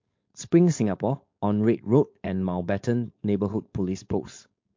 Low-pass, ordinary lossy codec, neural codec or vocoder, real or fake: 7.2 kHz; MP3, 48 kbps; codec, 16 kHz, 4.8 kbps, FACodec; fake